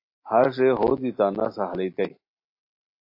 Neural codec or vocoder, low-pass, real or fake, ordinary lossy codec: none; 5.4 kHz; real; MP3, 32 kbps